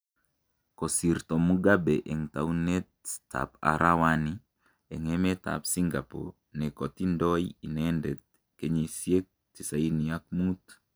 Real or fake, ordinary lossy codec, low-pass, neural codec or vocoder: real; none; none; none